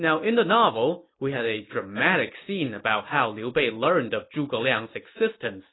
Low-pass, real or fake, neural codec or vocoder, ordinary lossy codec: 7.2 kHz; real; none; AAC, 16 kbps